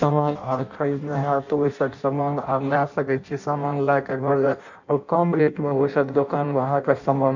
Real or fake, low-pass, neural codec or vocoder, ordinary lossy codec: fake; 7.2 kHz; codec, 16 kHz in and 24 kHz out, 0.6 kbps, FireRedTTS-2 codec; none